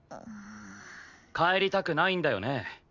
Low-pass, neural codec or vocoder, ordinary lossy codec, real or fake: 7.2 kHz; none; none; real